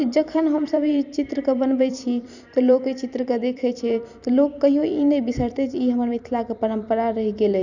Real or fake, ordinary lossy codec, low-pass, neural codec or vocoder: fake; none; 7.2 kHz; vocoder, 44.1 kHz, 128 mel bands every 256 samples, BigVGAN v2